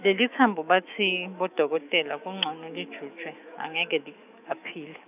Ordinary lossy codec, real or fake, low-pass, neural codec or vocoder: none; fake; 3.6 kHz; autoencoder, 48 kHz, 128 numbers a frame, DAC-VAE, trained on Japanese speech